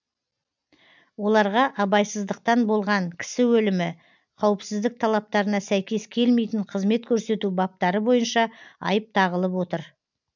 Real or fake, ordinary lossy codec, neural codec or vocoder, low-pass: real; none; none; 7.2 kHz